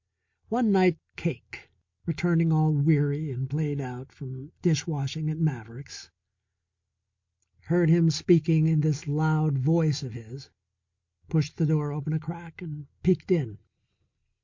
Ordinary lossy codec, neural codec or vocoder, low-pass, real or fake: MP3, 48 kbps; none; 7.2 kHz; real